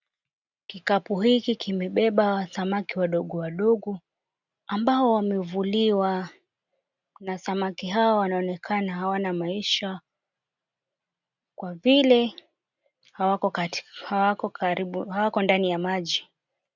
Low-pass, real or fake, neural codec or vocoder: 7.2 kHz; real; none